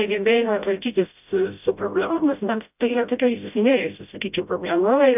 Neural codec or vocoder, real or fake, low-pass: codec, 16 kHz, 0.5 kbps, FreqCodec, smaller model; fake; 3.6 kHz